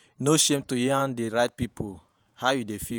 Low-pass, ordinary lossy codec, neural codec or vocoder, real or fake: none; none; vocoder, 48 kHz, 128 mel bands, Vocos; fake